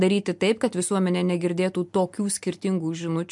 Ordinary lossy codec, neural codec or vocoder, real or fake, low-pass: MP3, 64 kbps; none; real; 10.8 kHz